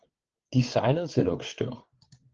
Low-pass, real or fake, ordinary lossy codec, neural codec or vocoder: 7.2 kHz; fake; Opus, 16 kbps; codec, 16 kHz, 8 kbps, FreqCodec, larger model